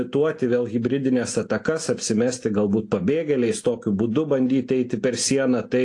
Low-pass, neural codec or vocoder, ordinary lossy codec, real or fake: 10.8 kHz; none; AAC, 48 kbps; real